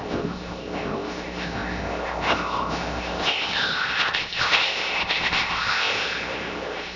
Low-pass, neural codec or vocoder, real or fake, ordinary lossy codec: 7.2 kHz; codec, 16 kHz, 0.7 kbps, FocalCodec; fake; none